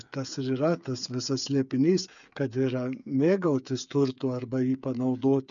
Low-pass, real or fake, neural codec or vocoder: 7.2 kHz; fake; codec, 16 kHz, 8 kbps, FreqCodec, smaller model